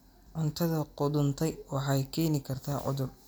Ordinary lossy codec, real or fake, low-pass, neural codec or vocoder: none; real; none; none